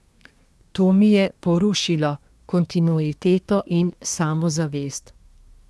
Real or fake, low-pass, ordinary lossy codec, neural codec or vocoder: fake; none; none; codec, 24 kHz, 1 kbps, SNAC